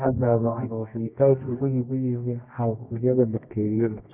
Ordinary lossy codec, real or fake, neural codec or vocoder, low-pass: none; fake; codec, 24 kHz, 0.9 kbps, WavTokenizer, medium music audio release; 3.6 kHz